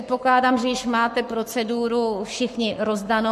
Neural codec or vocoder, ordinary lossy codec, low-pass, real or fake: codec, 44.1 kHz, 7.8 kbps, Pupu-Codec; MP3, 64 kbps; 14.4 kHz; fake